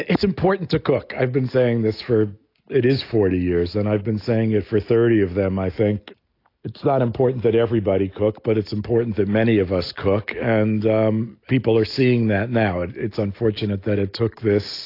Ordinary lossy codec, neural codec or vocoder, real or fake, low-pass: AAC, 32 kbps; none; real; 5.4 kHz